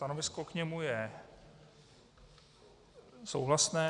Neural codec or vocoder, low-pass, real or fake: none; 10.8 kHz; real